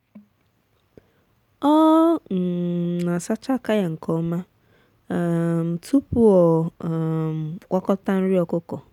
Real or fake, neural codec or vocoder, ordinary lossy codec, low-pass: real; none; none; 19.8 kHz